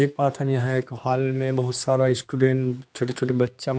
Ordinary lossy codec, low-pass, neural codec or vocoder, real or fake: none; none; codec, 16 kHz, 2 kbps, X-Codec, HuBERT features, trained on general audio; fake